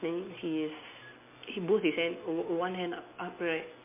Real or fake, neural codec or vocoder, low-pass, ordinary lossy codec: real; none; 3.6 kHz; MP3, 24 kbps